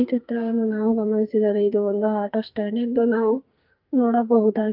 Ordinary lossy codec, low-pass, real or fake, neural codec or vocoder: Opus, 24 kbps; 5.4 kHz; fake; codec, 44.1 kHz, 2.6 kbps, SNAC